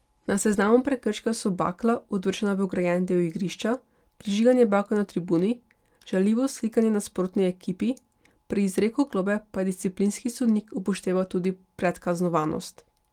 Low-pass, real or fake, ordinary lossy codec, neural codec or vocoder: 19.8 kHz; real; Opus, 24 kbps; none